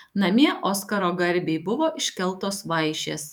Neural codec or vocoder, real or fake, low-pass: autoencoder, 48 kHz, 128 numbers a frame, DAC-VAE, trained on Japanese speech; fake; 19.8 kHz